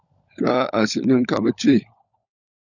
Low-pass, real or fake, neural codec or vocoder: 7.2 kHz; fake; codec, 16 kHz, 16 kbps, FunCodec, trained on LibriTTS, 50 frames a second